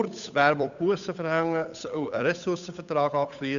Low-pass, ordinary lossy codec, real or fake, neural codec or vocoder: 7.2 kHz; none; fake; codec, 16 kHz, 8 kbps, FunCodec, trained on Chinese and English, 25 frames a second